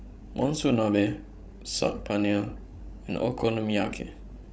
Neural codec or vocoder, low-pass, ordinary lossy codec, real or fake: codec, 16 kHz, 16 kbps, FreqCodec, larger model; none; none; fake